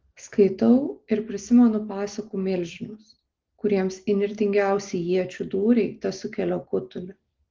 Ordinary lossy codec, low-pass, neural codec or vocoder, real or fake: Opus, 16 kbps; 7.2 kHz; none; real